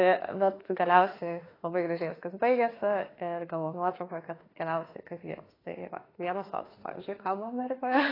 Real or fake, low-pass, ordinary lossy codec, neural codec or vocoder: fake; 5.4 kHz; AAC, 24 kbps; autoencoder, 48 kHz, 32 numbers a frame, DAC-VAE, trained on Japanese speech